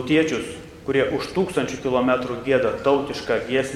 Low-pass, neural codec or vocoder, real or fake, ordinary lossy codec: 19.8 kHz; vocoder, 44.1 kHz, 128 mel bands every 512 samples, BigVGAN v2; fake; MP3, 96 kbps